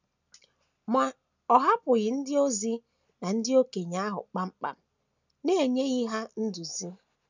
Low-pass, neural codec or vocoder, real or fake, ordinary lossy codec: 7.2 kHz; none; real; none